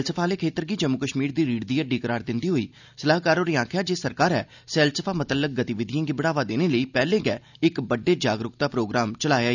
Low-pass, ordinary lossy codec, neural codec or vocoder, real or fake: 7.2 kHz; none; none; real